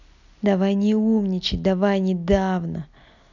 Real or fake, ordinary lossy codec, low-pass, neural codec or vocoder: real; none; 7.2 kHz; none